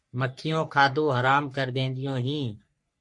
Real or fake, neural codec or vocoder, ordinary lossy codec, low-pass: fake; codec, 44.1 kHz, 3.4 kbps, Pupu-Codec; MP3, 48 kbps; 10.8 kHz